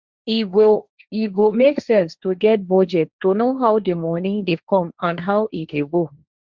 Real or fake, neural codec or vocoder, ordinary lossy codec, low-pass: fake; codec, 16 kHz, 1.1 kbps, Voila-Tokenizer; Opus, 64 kbps; 7.2 kHz